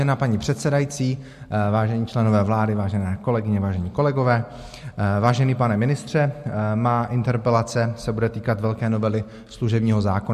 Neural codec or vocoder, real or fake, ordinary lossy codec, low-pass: none; real; MP3, 64 kbps; 14.4 kHz